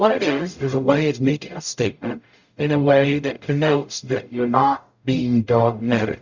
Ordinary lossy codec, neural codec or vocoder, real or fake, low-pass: Opus, 64 kbps; codec, 44.1 kHz, 0.9 kbps, DAC; fake; 7.2 kHz